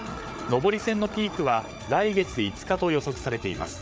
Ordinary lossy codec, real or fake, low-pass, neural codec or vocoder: none; fake; none; codec, 16 kHz, 8 kbps, FreqCodec, larger model